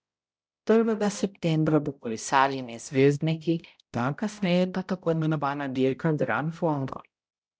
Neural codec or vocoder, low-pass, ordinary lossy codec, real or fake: codec, 16 kHz, 0.5 kbps, X-Codec, HuBERT features, trained on balanced general audio; none; none; fake